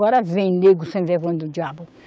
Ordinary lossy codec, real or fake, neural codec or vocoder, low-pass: none; fake; codec, 16 kHz, 6 kbps, DAC; none